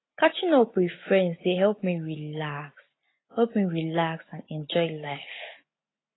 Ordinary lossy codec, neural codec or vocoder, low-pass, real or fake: AAC, 16 kbps; none; 7.2 kHz; real